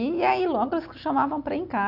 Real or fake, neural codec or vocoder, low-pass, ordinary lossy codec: real; none; 5.4 kHz; none